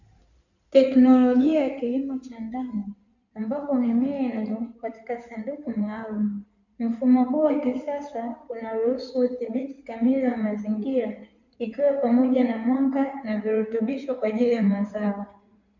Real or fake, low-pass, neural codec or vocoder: fake; 7.2 kHz; codec, 16 kHz in and 24 kHz out, 2.2 kbps, FireRedTTS-2 codec